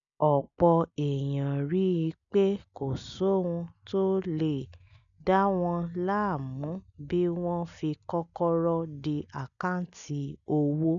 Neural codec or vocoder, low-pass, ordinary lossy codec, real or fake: none; 7.2 kHz; none; real